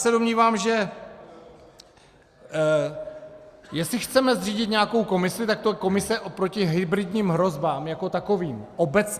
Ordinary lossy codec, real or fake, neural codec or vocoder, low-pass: Opus, 64 kbps; real; none; 14.4 kHz